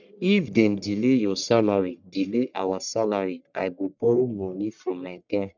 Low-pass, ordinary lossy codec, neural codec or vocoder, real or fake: 7.2 kHz; none; codec, 44.1 kHz, 1.7 kbps, Pupu-Codec; fake